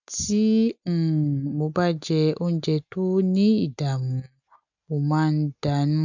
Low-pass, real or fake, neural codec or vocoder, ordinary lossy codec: 7.2 kHz; real; none; none